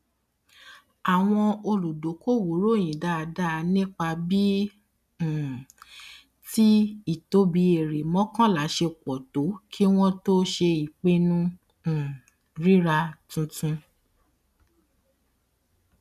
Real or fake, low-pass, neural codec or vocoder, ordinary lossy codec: real; 14.4 kHz; none; none